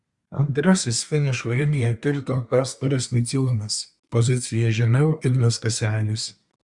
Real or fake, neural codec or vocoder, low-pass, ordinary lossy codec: fake; codec, 24 kHz, 1 kbps, SNAC; 10.8 kHz; Opus, 64 kbps